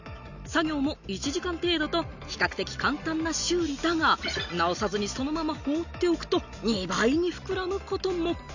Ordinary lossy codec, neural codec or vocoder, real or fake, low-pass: none; none; real; 7.2 kHz